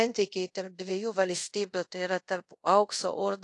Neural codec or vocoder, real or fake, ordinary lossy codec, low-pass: codec, 24 kHz, 0.5 kbps, DualCodec; fake; AAC, 48 kbps; 10.8 kHz